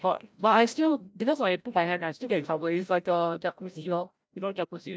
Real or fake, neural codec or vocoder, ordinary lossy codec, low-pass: fake; codec, 16 kHz, 0.5 kbps, FreqCodec, larger model; none; none